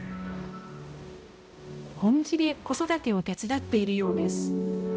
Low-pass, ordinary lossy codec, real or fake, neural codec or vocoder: none; none; fake; codec, 16 kHz, 0.5 kbps, X-Codec, HuBERT features, trained on balanced general audio